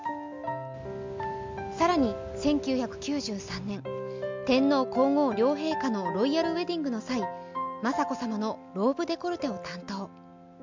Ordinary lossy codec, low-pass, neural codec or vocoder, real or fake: MP3, 64 kbps; 7.2 kHz; none; real